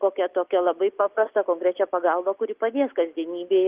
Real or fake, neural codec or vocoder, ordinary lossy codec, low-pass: real; none; Opus, 32 kbps; 3.6 kHz